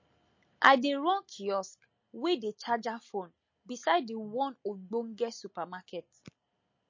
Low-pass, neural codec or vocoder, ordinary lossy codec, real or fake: 7.2 kHz; none; MP3, 32 kbps; real